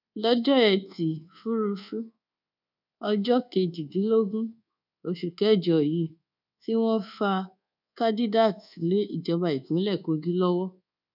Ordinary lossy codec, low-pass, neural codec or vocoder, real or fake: none; 5.4 kHz; codec, 24 kHz, 1.2 kbps, DualCodec; fake